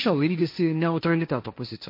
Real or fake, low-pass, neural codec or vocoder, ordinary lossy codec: fake; 5.4 kHz; codec, 16 kHz, 1.1 kbps, Voila-Tokenizer; MP3, 32 kbps